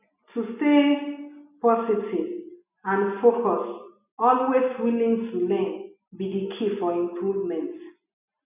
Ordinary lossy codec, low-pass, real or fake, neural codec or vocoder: none; 3.6 kHz; real; none